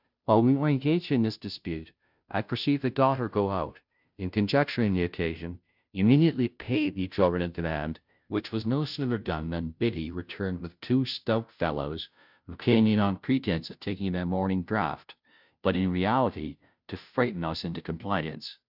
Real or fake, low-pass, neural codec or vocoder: fake; 5.4 kHz; codec, 16 kHz, 0.5 kbps, FunCodec, trained on Chinese and English, 25 frames a second